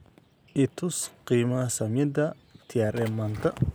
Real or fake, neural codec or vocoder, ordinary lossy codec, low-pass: real; none; none; none